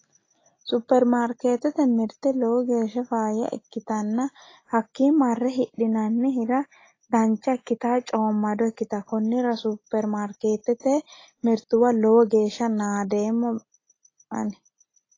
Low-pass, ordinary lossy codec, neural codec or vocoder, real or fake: 7.2 kHz; AAC, 32 kbps; none; real